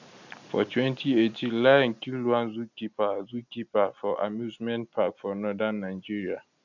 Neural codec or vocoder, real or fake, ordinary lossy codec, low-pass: none; real; none; 7.2 kHz